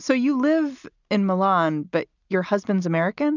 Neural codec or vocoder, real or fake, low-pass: none; real; 7.2 kHz